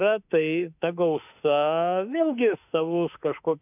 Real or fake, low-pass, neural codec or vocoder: fake; 3.6 kHz; autoencoder, 48 kHz, 32 numbers a frame, DAC-VAE, trained on Japanese speech